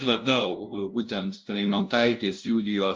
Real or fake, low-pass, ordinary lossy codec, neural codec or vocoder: fake; 7.2 kHz; Opus, 24 kbps; codec, 16 kHz, 0.5 kbps, FunCodec, trained on LibriTTS, 25 frames a second